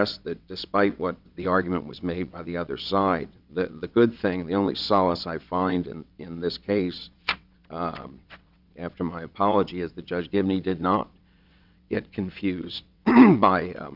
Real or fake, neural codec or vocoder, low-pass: fake; vocoder, 22.05 kHz, 80 mel bands, WaveNeXt; 5.4 kHz